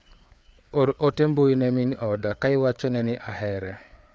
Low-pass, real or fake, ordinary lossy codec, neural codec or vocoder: none; fake; none; codec, 16 kHz, 4 kbps, FreqCodec, larger model